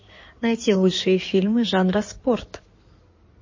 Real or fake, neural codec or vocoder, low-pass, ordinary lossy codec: fake; codec, 16 kHz in and 24 kHz out, 2.2 kbps, FireRedTTS-2 codec; 7.2 kHz; MP3, 32 kbps